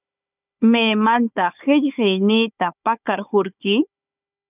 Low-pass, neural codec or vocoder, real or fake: 3.6 kHz; codec, 16 kHz, 4 kbps, FunCodec, trained on Chinese and English, 50 frames a second; fake